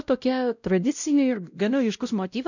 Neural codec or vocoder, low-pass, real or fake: codec, 16 kHz, 0.5 kbps, X-Codec, WavLM features, trained on Multilingual LibriSpeech; 7.2 kHz; fake